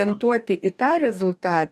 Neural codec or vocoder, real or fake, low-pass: codec, 44.1 kHz, 2.6 kbps, DAC; fake; 14.4 kHz